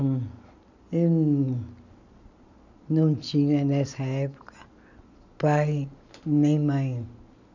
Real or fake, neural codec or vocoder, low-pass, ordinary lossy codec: real; none; 7.2 kHz; none